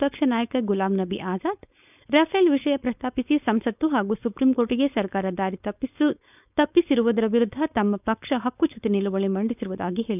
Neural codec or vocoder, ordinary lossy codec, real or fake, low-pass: codec, 16 kHz, 4.8 kbps, FACodec; none; fake; 3.6 kHz